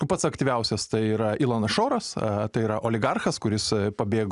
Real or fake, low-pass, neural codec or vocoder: real; 10.8 kHz; none